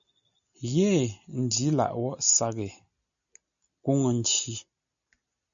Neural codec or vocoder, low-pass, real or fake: none; 7.2 kHz; real